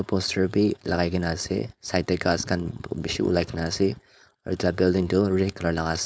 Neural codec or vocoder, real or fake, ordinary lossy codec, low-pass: codec, 16 kHz, 4.8 kbps, FACodec; fake; none; none